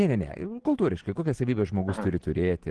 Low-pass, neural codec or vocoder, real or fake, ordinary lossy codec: 10.8 kHz; vocoder, 44.1 kHz, 128 mel bands every 512 samples, BigVGAN v2; fake; Opus, 16 kbps